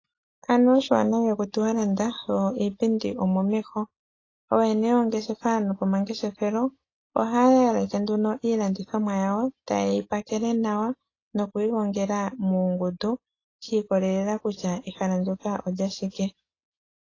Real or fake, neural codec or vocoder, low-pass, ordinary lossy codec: real; none; 7.2 kHz; AAC, 32 kbps